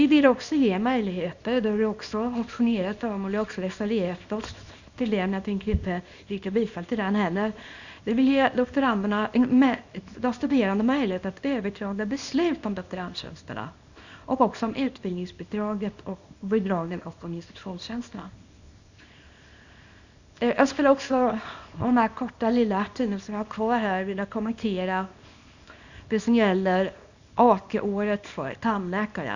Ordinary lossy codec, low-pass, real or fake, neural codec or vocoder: none; 7.2 kHz; fake; codec, 24 kHz, 0.9 kbps, WavTokenizer, small release